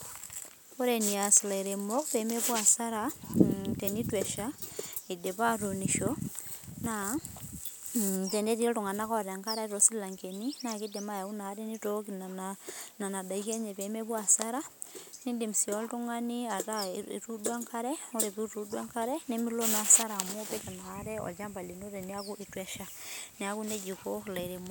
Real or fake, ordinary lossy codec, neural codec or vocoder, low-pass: real; none; none; none